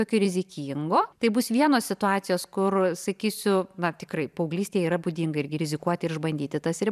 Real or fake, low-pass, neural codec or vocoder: fake; 14.4 kHz; vocoder, 44.1 kHz, 128 mel bands every 512 samples, BigVGAN v2